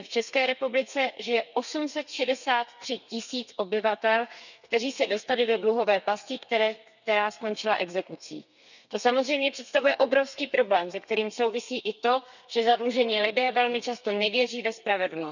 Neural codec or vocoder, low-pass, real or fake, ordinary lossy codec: codec, 32 kHz, 1.9 kbps, SNAC; 7.2 kHz; fake; none